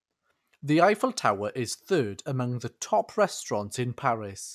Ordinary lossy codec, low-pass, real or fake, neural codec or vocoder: none; 14.4 kHz; real; none